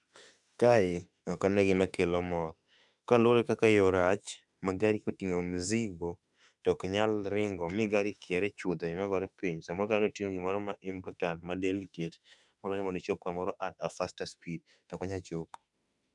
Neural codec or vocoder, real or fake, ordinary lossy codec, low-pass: autoencoder, 48 kHz, 32 numbers a frame, DAC-VAE, trained on Japanese speech; fake; none; 10.8 kHz